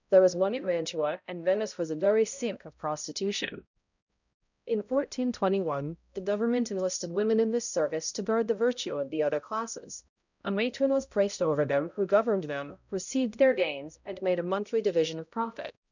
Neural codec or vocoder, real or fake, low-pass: codec, 16 kHz, 0.5 kbps, X-Codec, HuBERT features, trained on balanced general audio; fake; 7.2 kHz